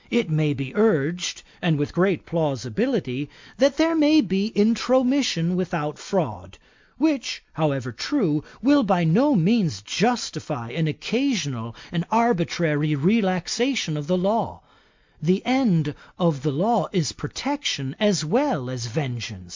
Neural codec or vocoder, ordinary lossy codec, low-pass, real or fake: none; MP3, 64 kbps; 7.2 kHz; real